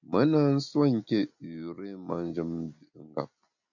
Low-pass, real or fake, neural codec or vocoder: 7.2 kHz; real; none